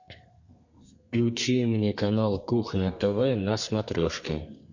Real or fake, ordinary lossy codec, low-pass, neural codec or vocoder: fake; MP3, 48 kbps; 7.2 kHz; codec, 32 kHz, 1.9 kbps, SNAC